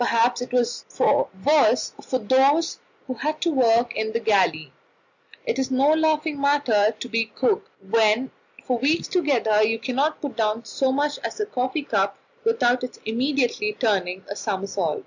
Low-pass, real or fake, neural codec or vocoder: 7.2 kHz; real; none